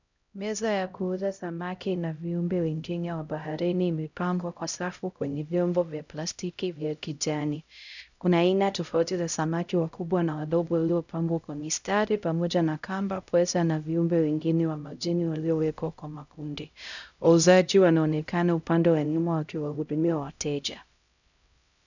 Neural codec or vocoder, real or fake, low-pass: codec, 16 kHz, 0.5 kbps, X-Codec, HuBERT features, trained on LibriSpeech; fake; 7.2 kHz